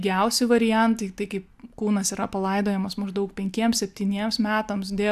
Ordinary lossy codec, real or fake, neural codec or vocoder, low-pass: AAC, 96 kbps; real; none; 14.4 kHz